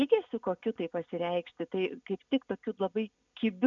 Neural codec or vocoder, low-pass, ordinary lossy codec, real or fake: none; 7.2 kHz; MP3, 96 kbps; real